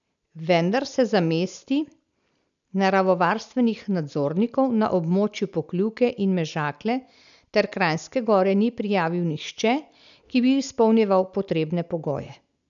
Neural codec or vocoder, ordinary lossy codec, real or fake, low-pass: none; none; real; 7.2 kHz